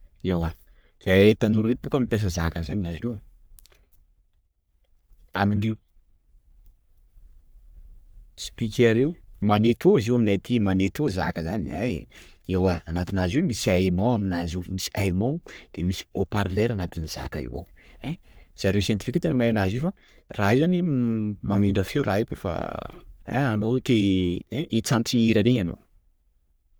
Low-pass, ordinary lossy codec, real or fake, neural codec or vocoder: none; none; fake; codec, 44.1 kHz, 3.4 kbps, Pupu-Codec